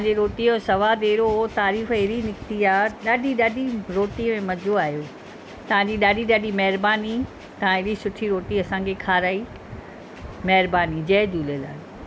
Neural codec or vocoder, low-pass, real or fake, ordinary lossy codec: none; none; real; none